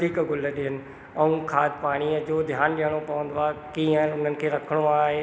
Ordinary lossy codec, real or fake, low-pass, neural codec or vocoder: none; real; none; none